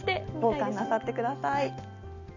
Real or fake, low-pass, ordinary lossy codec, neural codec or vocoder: real; 7.2 kHz; none; none